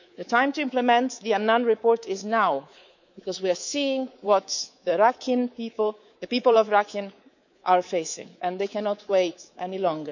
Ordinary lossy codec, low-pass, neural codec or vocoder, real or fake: none; 7.2 kHz; codec, 24 kHz, 3.1 kbps, DualCodec; fake